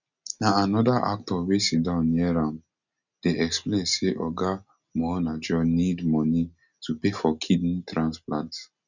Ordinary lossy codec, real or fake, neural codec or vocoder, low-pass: none; real; none; 7.2 kHz